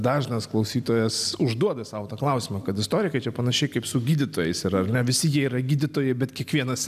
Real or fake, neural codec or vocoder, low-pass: real; none; 14.4 kHz